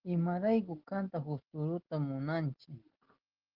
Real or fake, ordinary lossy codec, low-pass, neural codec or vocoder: real; Opus, 16 kbps; 5.4 kHz; none